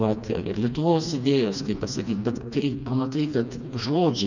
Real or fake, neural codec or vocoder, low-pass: fake; codec, 16 kHz, 2 kbps, FreqCodec, smaller model; 7.2 kHz